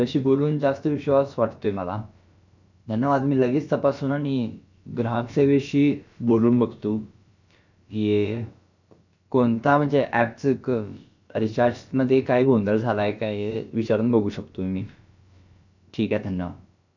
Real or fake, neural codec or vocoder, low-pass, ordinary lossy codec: fake; codec, 16 kHz, about 1 kbps, DyCAST, with the encoder's durations; 7.2 kHz; none